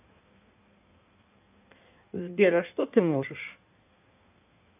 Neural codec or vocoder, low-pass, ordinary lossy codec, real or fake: codec, 16 kHz in and 24 kHz out, 1.1 kbps, FireRedTTS-2 codec; 3.6 kHz; none; fake